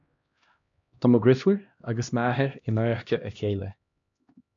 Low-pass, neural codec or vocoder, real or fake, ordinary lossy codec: 7.2 kHz; codec, 16 kHz, 1 kbps, X-Codec, HuBERT features, trained on LibriSpeech; fake; AAC, 64 kbps